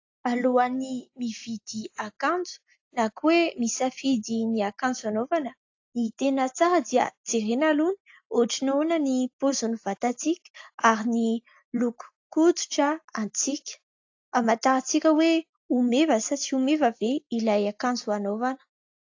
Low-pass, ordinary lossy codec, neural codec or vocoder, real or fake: 7.2 kHz; AAC, 48 kbps; none; real